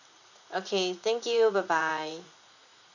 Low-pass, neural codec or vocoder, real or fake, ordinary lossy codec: 7.2 kHz; vocoder, 44.1 kHz, 80 mel bands, Vocos; fake; none